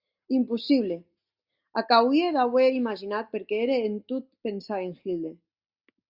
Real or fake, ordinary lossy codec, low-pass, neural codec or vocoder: real; Opus, 64 kbps; 5.4 kHz; none